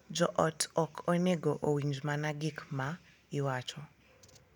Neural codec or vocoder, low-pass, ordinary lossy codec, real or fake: none; 19.8 kHz; none; real